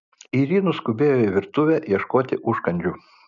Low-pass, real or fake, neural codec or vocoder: 7.2 kHz; real; none